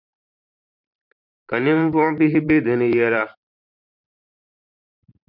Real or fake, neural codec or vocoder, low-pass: fake; vocoder, 44.1 kHz, 128 mel bands every 512 samples, BigVGAN v2; 5.4 kHz